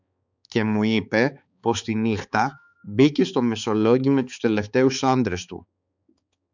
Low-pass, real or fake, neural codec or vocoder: 7.2 kHz; fake; codec, 16 kHz, 4 kbps, X-Codec, HuBERT features, trained on balanced general audio